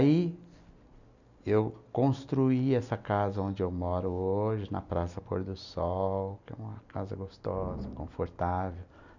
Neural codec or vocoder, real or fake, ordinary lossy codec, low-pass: none; real; none; 7.2 kHz